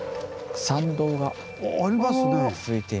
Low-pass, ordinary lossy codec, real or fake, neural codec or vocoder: none; none; real; none